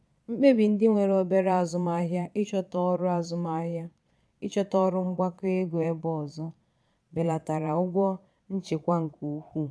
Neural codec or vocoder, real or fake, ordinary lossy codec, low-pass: vocoder, 22.05 kHz, 80 mel bands, Vocos; fake; none; none